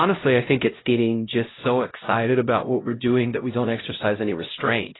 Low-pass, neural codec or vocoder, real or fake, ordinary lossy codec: 7.2 kHz; codec, 16 kHz, 0.5 kbps, X-Codec, HuBERT features, trained on LibriSpeech; fake; AAC, 16 kbps